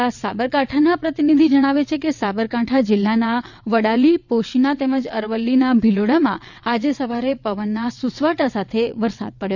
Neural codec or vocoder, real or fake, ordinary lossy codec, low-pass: vocoder, 22.05 kHz, 80 mel bands, WaveNeXt; fake; none; 7.2 kHz